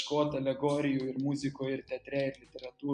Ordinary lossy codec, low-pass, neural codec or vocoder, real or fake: MP3, 96 kbps; 9.9 kHz; none; real